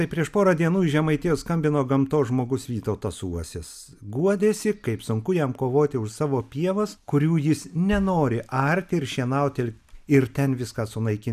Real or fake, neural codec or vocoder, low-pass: real; none; 14.4 kHz